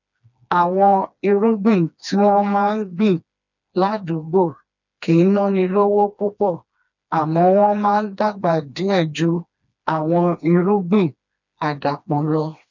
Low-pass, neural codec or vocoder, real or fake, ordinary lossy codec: 7.2 kHz; codec, 16 kHz, 2 kbps, FreqCodec, smaller model; fake; none